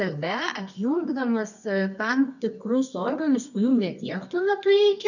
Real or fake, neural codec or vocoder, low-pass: fake; codec, 24 kHz, 0.9 kbps, WavTokenizer, medium music audio release; 7.2 kHz